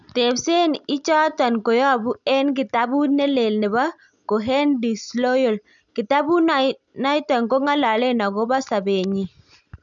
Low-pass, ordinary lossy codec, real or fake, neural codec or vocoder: 7.2 kHz; none; real; none